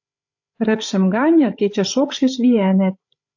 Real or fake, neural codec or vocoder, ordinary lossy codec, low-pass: fake; codec, 16 kHz, 16 kbps, FreqCodec, larger model; AAC, 48 kbps; 7.2 kHz